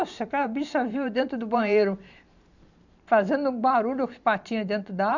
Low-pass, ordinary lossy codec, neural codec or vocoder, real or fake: 7.2 kHz; none; vocoder, 44.1 kHz, 128 mel bands every 512 samples, BigVGAN v2; fake